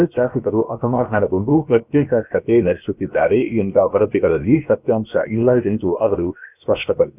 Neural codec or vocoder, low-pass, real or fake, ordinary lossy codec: codec, 16 kHz, 0.7 kbps, FocalCodec; 3.6 kHz; fake; none